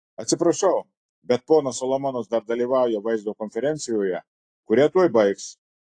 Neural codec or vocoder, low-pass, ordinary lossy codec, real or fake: none; 9.9 kHz; AAC, 48 kbps; real